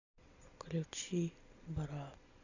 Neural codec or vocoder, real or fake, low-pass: none; real; 7.2 kHz